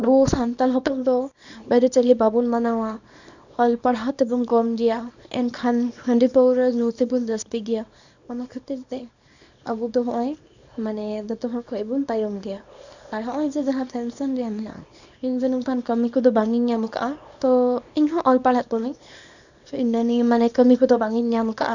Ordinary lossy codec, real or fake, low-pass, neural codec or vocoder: none; fake; 7.2 kHz; codec, 24 kHz, 0.9 kbps, WavTokenizer, small release